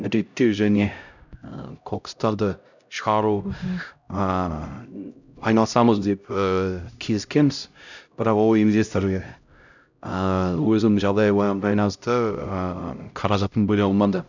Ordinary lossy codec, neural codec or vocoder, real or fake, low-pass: none; codec, 16 kHz, 0.5 kbps, X-Codec, HuBERT features, trained on LibriSpeech; fake; 7.2 kHz